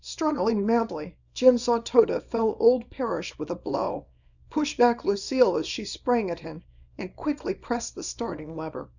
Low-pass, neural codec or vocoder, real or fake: 7.2 kHz; codec, 24 kHz, 0.9 kbps, WavTokenizer, small release; fake